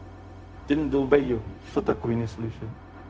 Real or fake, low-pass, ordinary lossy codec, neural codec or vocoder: fake; none; none; codec, 16 kHz, 0.4 kbps, LongCat-Audio-Codec